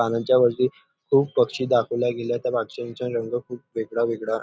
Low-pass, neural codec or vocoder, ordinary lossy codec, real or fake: none; none; none; real